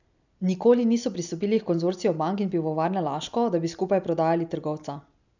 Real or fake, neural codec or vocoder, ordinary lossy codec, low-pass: real; none; none; 7.2 kHz